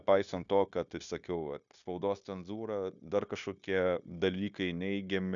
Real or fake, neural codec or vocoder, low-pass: fake; codec, 16 kHz, 0.9 kbps, LongCat-Audio-Codec; 7.2 kHz